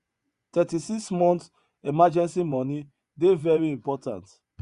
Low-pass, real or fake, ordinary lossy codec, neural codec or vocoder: 10.8 kHz; fake; Opus, 64 kbps; vocoder, 24 kHz, 100 mel bands, Vocos